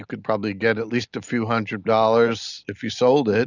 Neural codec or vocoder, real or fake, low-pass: none; real; 7.2 kHz